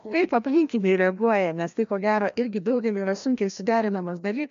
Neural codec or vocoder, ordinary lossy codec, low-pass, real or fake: codec, 16 kHz, 1 kbps, FreqCodec, larger model; MP3, 64 kbps; 7.2 kHz; fake